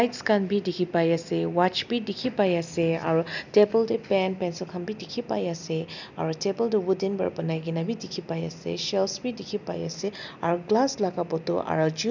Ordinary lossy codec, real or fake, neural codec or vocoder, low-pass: none; real; none; 7.2 kHz